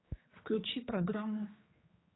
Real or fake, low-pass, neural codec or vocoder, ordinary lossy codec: fake; 7.2 kHz; codec, 16 kHz, 4 kbps, X-Codec, HuBERT features, trained on general audio; AAC, 16 kbps